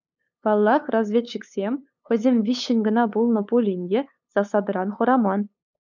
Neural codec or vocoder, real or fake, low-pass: codec, 16 kHz, 8 kbps, FunCodec, trained on LibriTTS, 25 frames a second; fake; 7.2 kHz